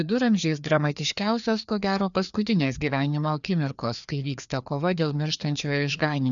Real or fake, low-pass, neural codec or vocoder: fake; 7.2 kHz; codec, 16 kHz, 2 kbps, FreqCodec, larger model